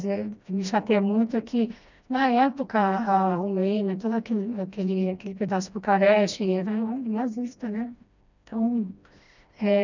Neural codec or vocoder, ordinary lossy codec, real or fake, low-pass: codec, 16 kHz, 1 kbps, FreqCodec, smaller model; none; fake; 7.2 kHz